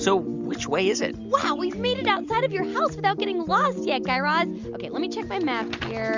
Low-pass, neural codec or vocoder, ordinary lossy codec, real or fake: 7.2 kHz; none; Opus, 64 kbps; real